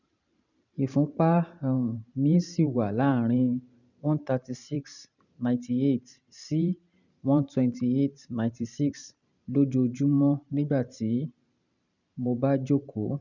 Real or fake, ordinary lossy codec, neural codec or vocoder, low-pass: fake; none; vocoder, 44.1 kHz, 128 mel bands every 512 samples, BigVGAN v2; 7.2 kHz